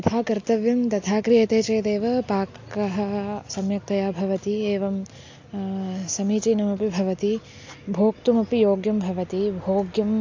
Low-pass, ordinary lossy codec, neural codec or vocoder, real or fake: 7.2 kHz; AAC, 48 kbps; none; real